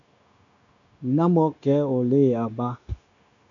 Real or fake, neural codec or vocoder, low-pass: fake; codec, 16 kHz, 0.9 kbps, LongCat-Audio-Codec; 7.2 kHz